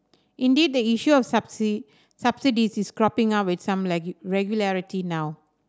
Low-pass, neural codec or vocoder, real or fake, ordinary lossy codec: none; none; real; none